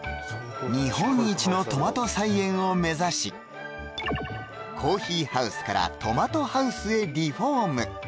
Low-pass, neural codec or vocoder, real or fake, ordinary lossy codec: none; none; real; none